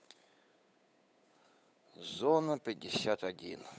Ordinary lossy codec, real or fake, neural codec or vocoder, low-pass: none; fake; codec, 16 kHz, 8 kbps, FunCodec, trained on Chinese and English, 25 frames a second; none